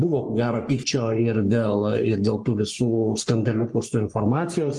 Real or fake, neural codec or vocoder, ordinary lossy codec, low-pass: fake; codec, 44.1 kHz, 3.4 kbps, Pupu-Codec; Opus, 24 kbps; 10.8 kHz